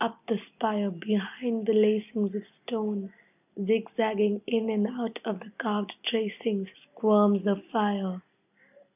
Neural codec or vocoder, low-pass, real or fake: none; 3.6 kHz; real